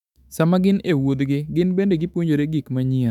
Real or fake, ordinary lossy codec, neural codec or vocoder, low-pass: fake; none; autoencoder, 48 kHz, 128 numbers a frame, DAC-VAE, trained on Japanese speech; 19.8 kHz